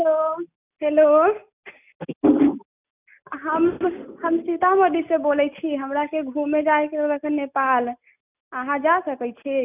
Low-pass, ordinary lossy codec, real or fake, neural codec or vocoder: 3.6 kHz; none; real; none